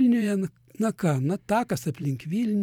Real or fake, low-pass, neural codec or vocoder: fake; 19.8 kHz; vocoder, 44.1 kHz, 128 mel bands every 512 samples, BigVGAN v2